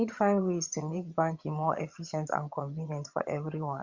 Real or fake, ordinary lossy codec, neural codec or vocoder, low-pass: fake; Opus, 64 kbps; vocoder, 22.05 kHz, 80 mel bands, HiFi-GAN; 7.2 kHz